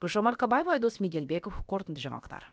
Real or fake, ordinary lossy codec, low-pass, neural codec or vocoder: fake; none; none; codec, 16 kHz, about 1 kbps, DyCAST, with the encoder's durations